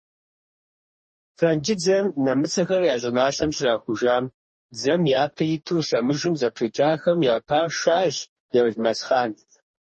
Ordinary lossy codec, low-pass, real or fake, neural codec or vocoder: MP3, 32 kbps; 10.8 kHz; fake; codec, 44.1 kHz, 2.6 kbps, DAC